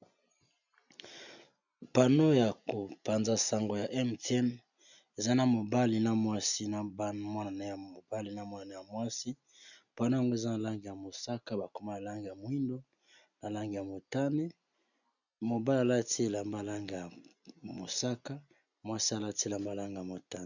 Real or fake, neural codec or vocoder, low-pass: real; none; 7.2 kHz